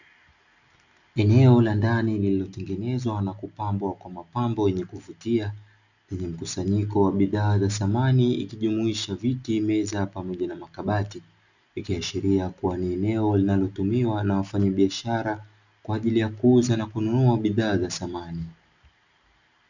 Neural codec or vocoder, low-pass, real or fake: none; 7.2 kHz; real